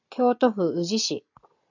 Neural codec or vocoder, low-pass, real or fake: none; 7.2 kHz; real